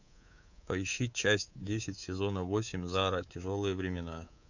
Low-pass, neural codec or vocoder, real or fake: 7.2 kHz; codec, 24 kHz, 3.1 kbps, DualCodec; fake